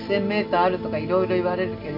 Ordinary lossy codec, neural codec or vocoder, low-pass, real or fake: none; none; 5.4 kHz; real